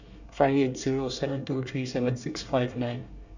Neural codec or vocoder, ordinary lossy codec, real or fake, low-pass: codec, 24 kHz, 1 kbps, SNAC; none; fake; 7.2 kHz